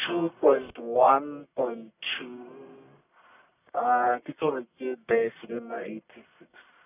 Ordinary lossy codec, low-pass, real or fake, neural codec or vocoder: none; 3.6 kHz; fake; codec, 44.1 kHz, 1.7 kbps, Pupu-Codec